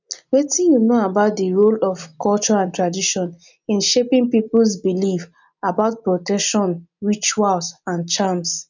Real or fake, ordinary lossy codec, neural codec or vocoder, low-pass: real; none; none; 7.2 kHz